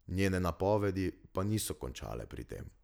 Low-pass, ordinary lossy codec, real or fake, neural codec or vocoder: none; none; real; none